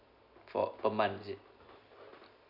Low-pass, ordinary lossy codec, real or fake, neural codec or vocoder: 5.4 kHz; none; real; none